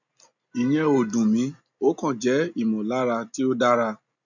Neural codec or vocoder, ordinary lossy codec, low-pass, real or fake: none; none; 7.2 kHz; real